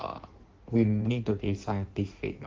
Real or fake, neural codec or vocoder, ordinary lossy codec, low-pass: fake; codec, 16 kHz in and 24 kHz out, 1.1 kbps, FireRedTTS-2 codec; Opus, 16 kbps; 7.2 kHz